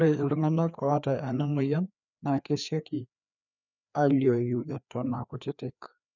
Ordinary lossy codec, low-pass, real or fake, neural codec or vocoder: none; 7.2 kHz; fake; codec, 16 kHz, 2 kbps, FreqCodec, larger model